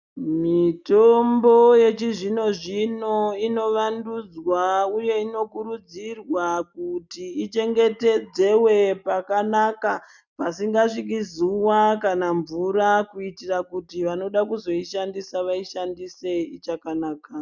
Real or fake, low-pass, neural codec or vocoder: real; 7.2 kHz; none